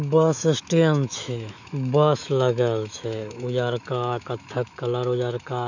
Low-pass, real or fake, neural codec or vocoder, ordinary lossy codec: 7.2 kHz; fake; autoencoder, 48 kHz, 128 numbers a frame, DAC-VAE, trained on Japanese speech; none